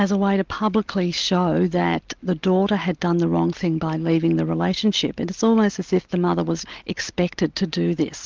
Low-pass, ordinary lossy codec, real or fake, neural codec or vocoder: 7.2 kHz; Opus, 32 kbps; real; none